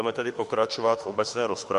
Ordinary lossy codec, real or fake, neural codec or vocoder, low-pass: MP3, 48 kbps; fake; autoencoder, 48 kHz, 32 numbers a frame, DAC-VAE, trained on Japanese speech; 14.4 kHz